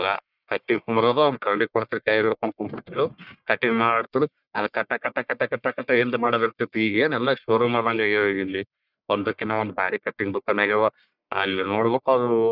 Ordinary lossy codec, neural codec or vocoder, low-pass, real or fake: none; codec, 44.1 kHz, 1.7 kbps, Pupu-Codec; 5.4 kHz; fake